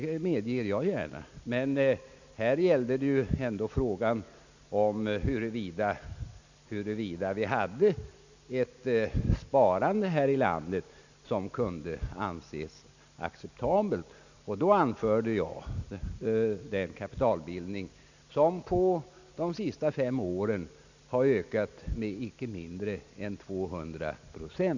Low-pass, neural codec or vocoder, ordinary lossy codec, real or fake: 7.2 kHz; none; none; real